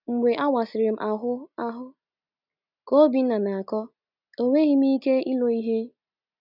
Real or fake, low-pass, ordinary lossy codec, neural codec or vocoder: real; 5.4 kHz; none; none